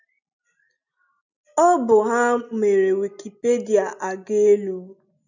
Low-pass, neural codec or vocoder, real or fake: 7.2 kHz; none; real